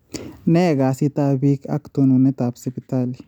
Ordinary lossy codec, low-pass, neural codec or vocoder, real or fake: none; 19.8 kHz; none; real